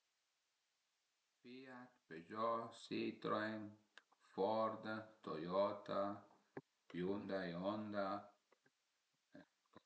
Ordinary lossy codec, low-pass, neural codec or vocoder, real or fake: none; none; none; real